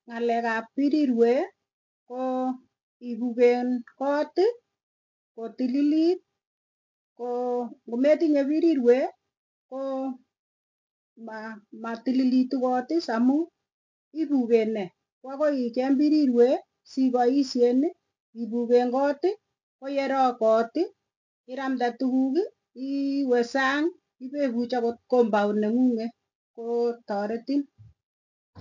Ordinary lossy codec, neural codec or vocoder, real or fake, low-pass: MP3, 48 kbps; none; real; 7.2 kHz